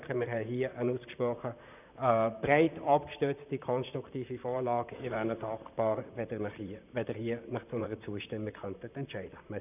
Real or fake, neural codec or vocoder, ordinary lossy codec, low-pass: fake; vocoder, 44.1 kHz, 128 mel bands, Pupu-Vocoder; none; 3.6 kHz